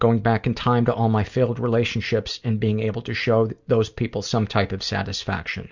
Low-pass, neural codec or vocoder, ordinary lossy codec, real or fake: 7.2 kHz; none; Opus, 64 kbps; real